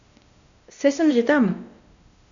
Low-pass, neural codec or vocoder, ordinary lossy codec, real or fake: 7.2 kHz; codec, 16 kHz, 1 kbps, X-Codec, WavLM features, trained on Multilingual LibriSpeech; none; fake